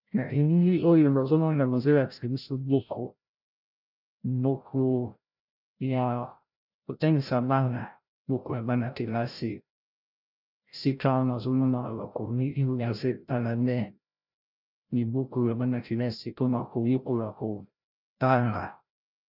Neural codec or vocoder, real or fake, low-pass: codec, 16 kHz, 0.5 kbps, FreqCodec, larger model; fake; 5.4 kHz